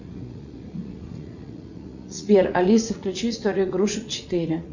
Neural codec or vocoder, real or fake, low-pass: vocoder, 44.1 kHz, 80 mel bands, Vocos; fake; 7.2 kHz